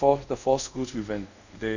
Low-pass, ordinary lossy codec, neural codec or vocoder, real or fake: 7.2 kHz; none; codec, 24 kHz, 0.5 kbps, DualCodec; fake